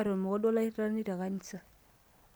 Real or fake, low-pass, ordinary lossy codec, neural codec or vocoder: real; none; none; none